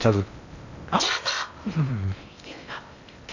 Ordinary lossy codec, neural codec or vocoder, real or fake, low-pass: none; codec, 16 kHz in and 24 kHz out, 0.6 kbps, FocalCodec, streaming, 2048 codes; fake; 7.2 kHz